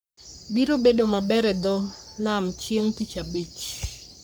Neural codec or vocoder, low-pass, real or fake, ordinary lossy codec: codec, 44.1 kHz, 3.4 kbps, Pupu-Codec; none; fake; none